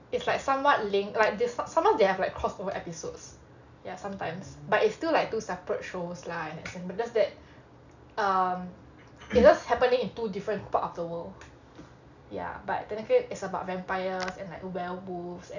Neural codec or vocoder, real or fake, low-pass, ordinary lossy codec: none; real; 7.2 kHz; none